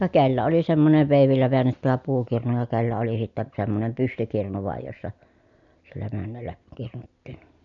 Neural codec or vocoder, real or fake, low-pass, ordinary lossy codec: none; real; 7.2 kHz; none